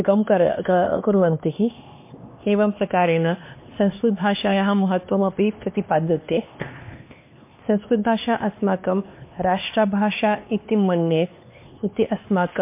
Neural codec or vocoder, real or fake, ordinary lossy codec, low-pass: codec, 16 kHz, 2 kbps, X-Codec, HuBERT features, trained on LibriSpeech; fake; MP3, 24 kbps; 3.6 kHz